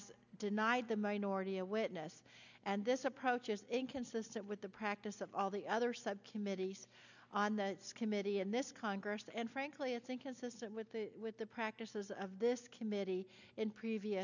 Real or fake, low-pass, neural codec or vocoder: real; 7.2 kHz; none